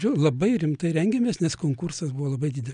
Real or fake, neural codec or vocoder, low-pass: real; none; 9.9 kHz